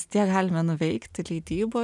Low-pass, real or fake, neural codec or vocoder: 10.8 kHz; real; none